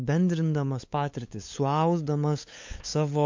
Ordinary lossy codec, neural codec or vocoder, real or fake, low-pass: MP3, 48 kbps; codec, 16 kHz, 8 kbps, FunCodec, trained on LibriTTS, 25 frames a second; fake; 7.2 kHz